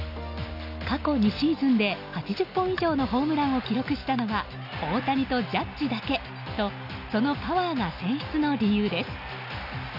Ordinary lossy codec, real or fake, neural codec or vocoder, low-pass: none; real; none; 5.4 kHz